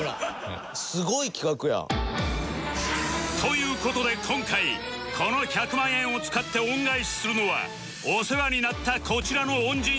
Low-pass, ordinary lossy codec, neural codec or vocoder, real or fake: none; none; none; real